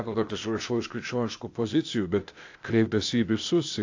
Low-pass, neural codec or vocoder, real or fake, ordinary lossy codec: 7.2 kHz; codec, 16 kHz, 0.8 kbps, ZipCodec; fake; AAC, 48 kbps